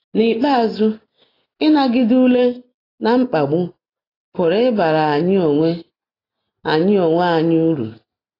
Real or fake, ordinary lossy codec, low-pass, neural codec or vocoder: real; AAC, 24 kbps; 5.4 kHz; none